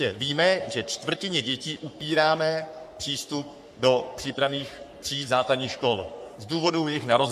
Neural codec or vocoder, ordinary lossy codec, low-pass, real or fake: codec, 44.1 kHz, 3.4 kbps, Pupu-Codec; AAC, 96 kbps; 14.4 kHz; fake